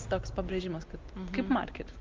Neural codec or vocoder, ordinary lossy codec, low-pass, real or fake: none; Opus, 24 kbps; 7.2 kHz; real